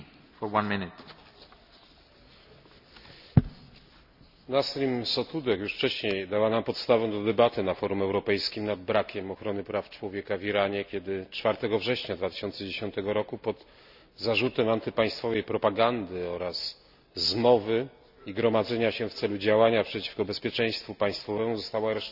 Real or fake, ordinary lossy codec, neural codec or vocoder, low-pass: real; none; none; 5.4 kHz